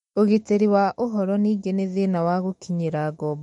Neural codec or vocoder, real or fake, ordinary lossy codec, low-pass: autoencoder, 48 kHz, 128 numbers a frame, DAC-VAE, trained on Japanese speech; fake; MP3, 48 kbps; 19.8 kHz